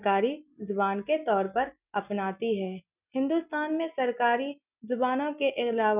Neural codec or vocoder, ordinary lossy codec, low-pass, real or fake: none; none; 3.6 kHz; real